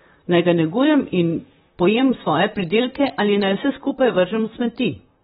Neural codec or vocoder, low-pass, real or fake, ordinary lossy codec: vocoder, 44.1 kHz, 128 mel bands, Pupu-Vocoder; 19.8 kHz; fake; AAC, 16 kbps